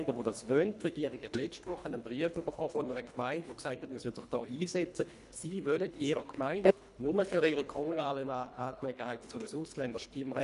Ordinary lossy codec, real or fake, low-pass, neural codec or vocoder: none; fake; 10.8 kHz; codec, 24 kHz, 1.5 kbps, HILCodec